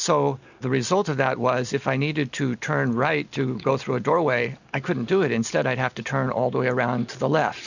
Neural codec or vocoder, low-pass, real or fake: none; 7.2 kHz; real